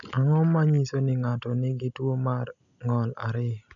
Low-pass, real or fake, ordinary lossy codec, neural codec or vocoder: 7.2 kHz; real; none; none